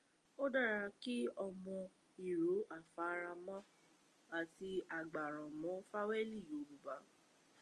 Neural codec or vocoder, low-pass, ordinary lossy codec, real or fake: none; 10.8 kHz; Opus, 32 kbps; real